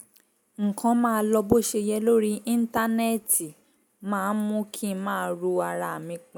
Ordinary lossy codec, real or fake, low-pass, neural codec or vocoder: none; real; none; none